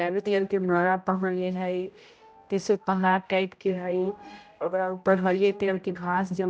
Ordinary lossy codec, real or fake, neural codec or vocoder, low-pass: none; fake; codec, 16 kHz, 0.5 kbps, X-Codec, HuBERT features, trained on general audio; none